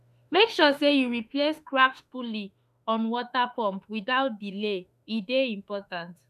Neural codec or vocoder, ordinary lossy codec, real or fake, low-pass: autoencoder, 48 kHz, 32 numbers a frame, DAC-VAE, trained on Japanese speech; none; fake; 14.4 kHz